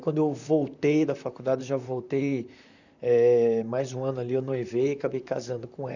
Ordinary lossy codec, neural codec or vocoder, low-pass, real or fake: none; vocoder, 44.1 kHz, 128 mel bands, Pupu-Vocoder; 7.2 kHz; fake